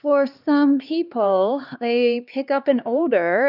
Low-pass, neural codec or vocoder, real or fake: 5.4 kHz; codec, 16 kHz, 2 kbps, X-Codec, HuBERT features, trained on LibriSpeech; fake